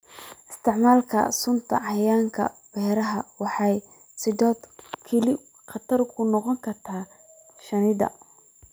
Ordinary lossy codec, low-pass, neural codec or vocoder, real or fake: none; none; none; real